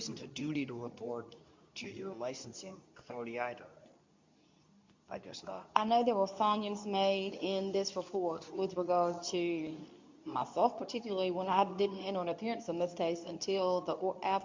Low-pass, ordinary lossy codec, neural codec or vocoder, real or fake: 7.2 kHz; MP3, 64 kbps; codec, 24 kHz, 0.9 kbps, WavTokenizer, medium speech release version 2; fake